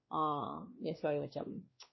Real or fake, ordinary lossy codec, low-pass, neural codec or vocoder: fake; MP3, 24 kbps; 7.2 kHz; codec, 16 kHz, 2 kbps, X-Codec, WavLM features, trained on Multilingual LibriSpeech